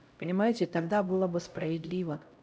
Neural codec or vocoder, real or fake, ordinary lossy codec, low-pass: codec, 16 kHz, 0.5 kbps, X-Codec, HuBERT features, trained on LibriSpeech; fake; none; none